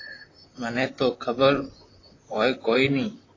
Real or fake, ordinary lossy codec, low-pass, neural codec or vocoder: fake; AAC, 32 kbps; 7.2 kHz; vocoder, 44.1 kHz, 128 mel bands, Pupu-Vocoder